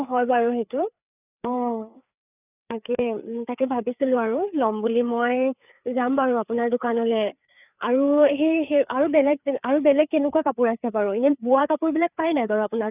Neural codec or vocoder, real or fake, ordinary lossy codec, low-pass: codec, 16 kHz, 4 kbps, FreqCodec, larger model; fake; none; 3.6 kHz